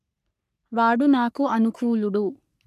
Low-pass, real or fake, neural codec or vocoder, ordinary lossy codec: 14.4 kHz; fake; codec, 44.1 kHz, 3.4 kbps, Pupu-Codec; none